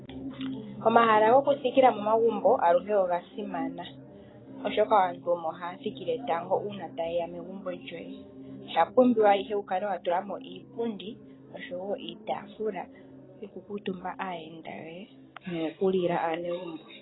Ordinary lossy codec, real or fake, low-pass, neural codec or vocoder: AAC, 16 kbps; real; 7.2 kHz; none